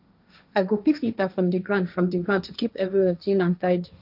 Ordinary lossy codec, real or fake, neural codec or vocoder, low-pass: none; fake; codec, 16 kHz, 1.1 kbps, Voila-Tokenizer; 5.4 kHz